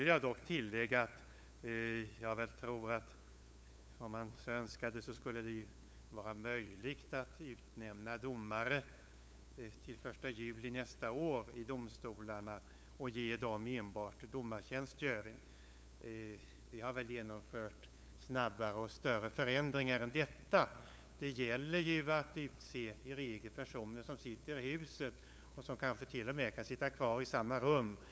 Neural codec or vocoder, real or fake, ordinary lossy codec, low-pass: codec, 16 kHz, 8 kbps, FunCodec, trained on LibriTTS, 25 frames a second; fake; none; none